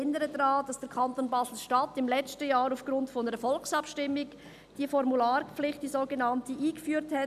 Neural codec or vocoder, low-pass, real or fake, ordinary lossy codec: none; 14.4 kHz; real; none